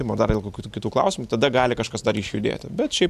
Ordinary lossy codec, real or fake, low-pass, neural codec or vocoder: AAC, 96 kbps; real; 14.4 kHz; none